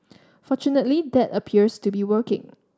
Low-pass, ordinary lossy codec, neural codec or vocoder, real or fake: none; none; none; real